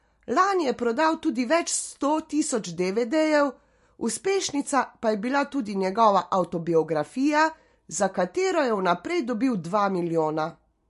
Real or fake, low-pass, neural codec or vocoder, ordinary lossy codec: real; 14.4 kHz; none; MP3, 48 kbps